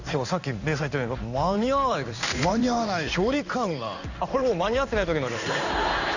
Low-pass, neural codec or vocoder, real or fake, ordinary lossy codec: 7.2 kHz; codec, 16 kHz in and 24 kHz out, 1 kbps, XY-Tokenizer; fake; none